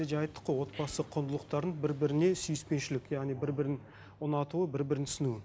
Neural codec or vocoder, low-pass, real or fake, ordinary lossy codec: none; none; real; none